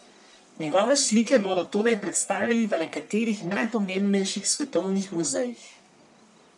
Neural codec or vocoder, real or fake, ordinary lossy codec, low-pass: codec, 44.1 kHz, 1.7 kbps, Pupu-Codec; fake; none; 10.8 kHz